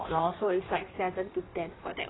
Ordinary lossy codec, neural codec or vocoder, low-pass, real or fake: AAC, 16 kbps; codec, 16 kHz in and 24 kHz out, 1.1 kbps, FireRedTTS-2 codec; 7.2 kHz; fake